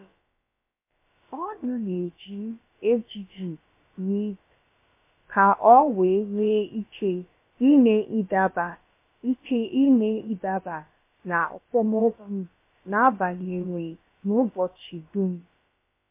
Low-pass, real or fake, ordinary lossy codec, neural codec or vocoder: 3.6 kHz; fake; MP3, 24 kbps; codec, 16 kHz, about 1 kbps, DyCAST, with the encoder's durations